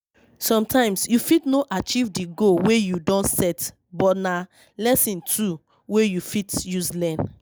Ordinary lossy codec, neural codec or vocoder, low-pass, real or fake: none; none; none; real